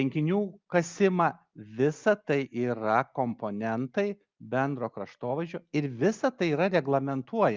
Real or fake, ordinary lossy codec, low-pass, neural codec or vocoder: real; Opus, 24 kbps; 7.2 kHz; none